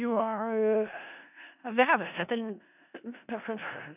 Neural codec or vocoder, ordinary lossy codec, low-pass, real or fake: codec, 16 kHz in and 24 kHz out, 0.4 kbps, LongCat-Audio-Codec, four codebook decoder; none; 3.6 kHz; fake